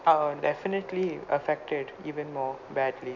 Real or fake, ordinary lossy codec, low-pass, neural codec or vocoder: real; none; 7.2 kHz; none